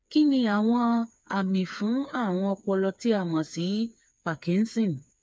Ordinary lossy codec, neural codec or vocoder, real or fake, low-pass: none; codec, 16 kHz, 4 kbps, FreqCodec, smaller model; fake; none